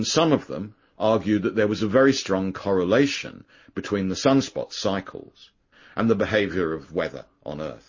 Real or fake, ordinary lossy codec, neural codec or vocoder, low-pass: real; MP3, 32 kbps; none; 7.2 kHz